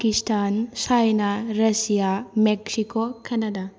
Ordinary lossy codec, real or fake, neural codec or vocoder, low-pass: none; real; none; none